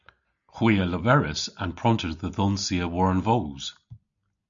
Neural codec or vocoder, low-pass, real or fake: none; 7.2 kHz; real